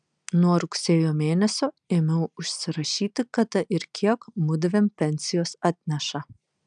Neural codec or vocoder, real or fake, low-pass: none; real; 9.9 kHz